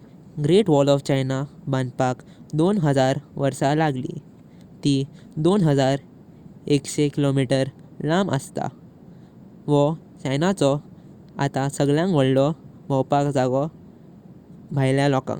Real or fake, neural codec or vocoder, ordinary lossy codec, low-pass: real; none; Opus, 64 kbps; 19.8 kHz